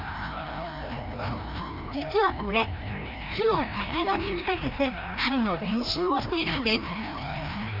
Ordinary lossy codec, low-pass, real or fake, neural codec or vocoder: none; 5.4 kHz; fake; codec, 16 kHz, 1 kbps, FreqCodec, larger model